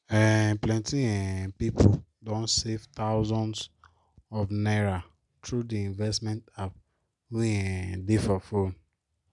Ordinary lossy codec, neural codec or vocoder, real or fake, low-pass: none; none; real; 10.8 kHz